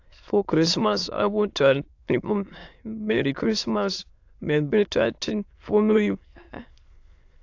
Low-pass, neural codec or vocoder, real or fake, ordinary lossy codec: 7.2 kHz; autoencoder, 22.05 kHz, a latent of 192 numbers a frame, VITS, trained on many speakers; fake; AAC, 48 kbps